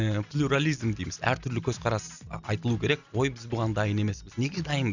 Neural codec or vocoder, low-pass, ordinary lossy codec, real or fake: none; 7.2 kHz; none; real